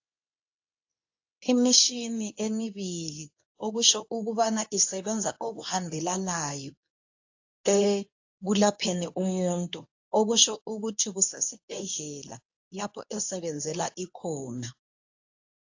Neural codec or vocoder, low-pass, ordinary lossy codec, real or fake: codec, 24 kHz, 0.9 kbps, WavTokenizer, medium speech release version 2; 7.2 kHz; AAC, 48 kbps; fake